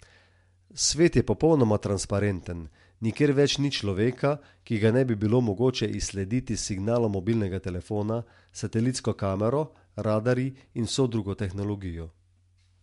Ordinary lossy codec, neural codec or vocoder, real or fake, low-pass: MP3, 64 kbps; none; real; 10.8 kHz